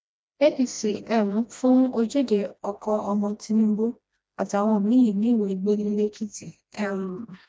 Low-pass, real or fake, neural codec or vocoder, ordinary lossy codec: none; fake; codec, 16 kHz, 1 kbps, FreqCodec, smaller model; none